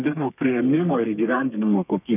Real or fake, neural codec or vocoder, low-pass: fake; codec, 32 kHz, 1.9 kbps, SNAC; 3.6 kHz